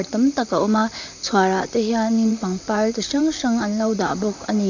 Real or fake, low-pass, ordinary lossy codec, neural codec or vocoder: fake; 7.2 kHz; none; vocoder, 44.1 kHz, 128 mel bands, Pupu-Vocoder